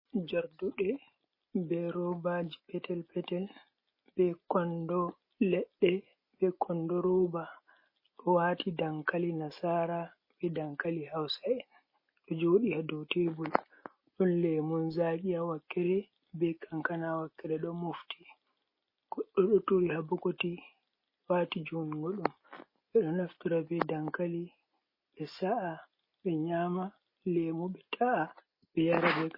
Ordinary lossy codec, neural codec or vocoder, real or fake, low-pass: MP3, 24 kbps; none; real; 5.4 kHz